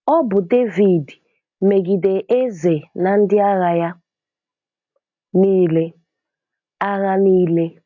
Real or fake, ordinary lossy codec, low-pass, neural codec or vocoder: real; AAC, 48 kbps; 7.2 kHz; none